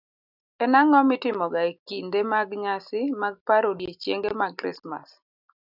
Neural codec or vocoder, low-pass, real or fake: none; 5.4 kHz; real